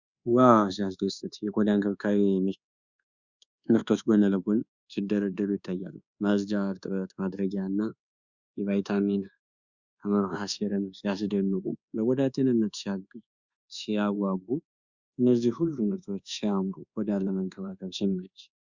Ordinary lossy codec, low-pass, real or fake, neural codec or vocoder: Opus, 64 kbps; 7.2 kHz; fake; codec, 24 kHz, 1.2 kbps, DualCodec